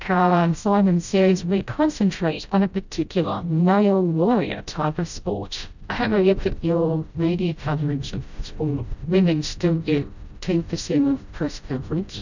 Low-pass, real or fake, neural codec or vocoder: 7.2 kHz; fake; codec, 16 kHz, 0.5 kbps, FreqCodec, smaller model